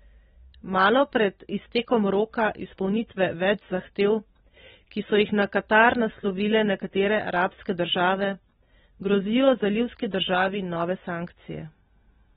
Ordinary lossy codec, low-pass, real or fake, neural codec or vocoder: AAC, 16 kbps; 19.8 kHz; real; none